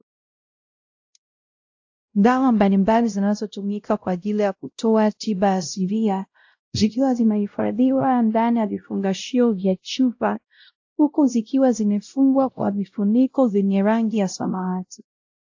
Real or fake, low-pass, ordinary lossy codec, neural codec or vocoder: fake; 7.2 kHz; AAC, 48 kbps; codec, 16 kHz, 0.5 kbps, X-Codec, WavLM features, trained on Multilingual LibriSpeech